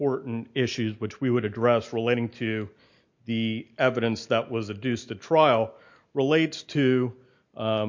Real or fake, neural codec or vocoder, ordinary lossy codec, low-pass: real; none; MP3, 48 kbps; 7.2 kHz